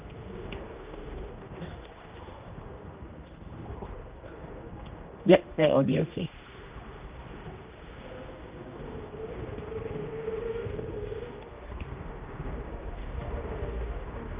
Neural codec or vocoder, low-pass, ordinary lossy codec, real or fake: codec, 16 kHz, 1 kbps, X-Codec, HuBERT features, trained on general audio; 3.6 kHz; Opus, 24 kbps; fake